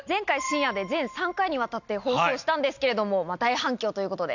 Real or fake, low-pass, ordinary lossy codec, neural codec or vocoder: real; 7.2 kHz; none; none